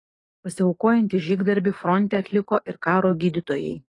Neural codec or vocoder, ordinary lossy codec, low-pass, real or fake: codec, 44.1 kHz, 7.8 kbps, DAC; AAC, 32 kbps; 10.8 kHz; fake